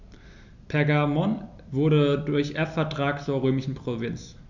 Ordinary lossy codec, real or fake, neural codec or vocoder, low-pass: none; real; none; 7.2 kHz